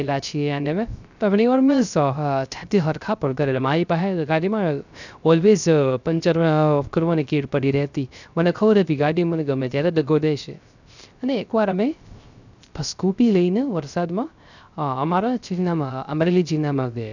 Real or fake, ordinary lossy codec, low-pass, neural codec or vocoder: fake; none; 7.2 kHz; codec, 16 kHz, 0.3 kbps, FocalCodec